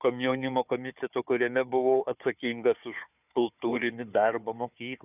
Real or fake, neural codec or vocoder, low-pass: fake; codec, 16 kHz, 4 kbps, FunCodec, trained on Chinese and English, 50 frames a second; 3.6 kHz